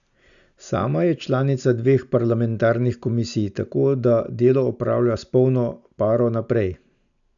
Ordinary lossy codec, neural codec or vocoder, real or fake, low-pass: none; none; real; 7.2 kHz